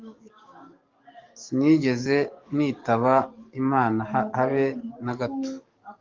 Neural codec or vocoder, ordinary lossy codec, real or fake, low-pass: codec, 44.1 kHz, 7.8 kbps, DAC; Opus, 32 kbps; fake; 7.2 kHz